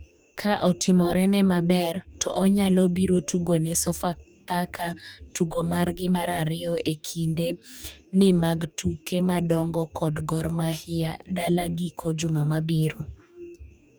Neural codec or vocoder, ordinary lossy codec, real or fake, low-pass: codec, 44.1 kHz, 2.6 kbps, DAC; none; fake; none